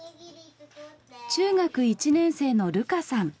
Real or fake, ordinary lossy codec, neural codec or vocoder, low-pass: real; none; none; none